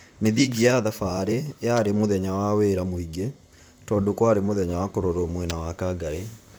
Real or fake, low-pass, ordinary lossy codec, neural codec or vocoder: fake; none; none; vocoder, 44.1 kHz, 128 mel bands, Pupu-Vocoder